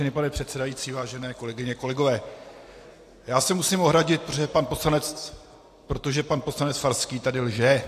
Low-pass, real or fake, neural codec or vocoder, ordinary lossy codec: 14.4 kHz; real; none; AAC, 64 kbps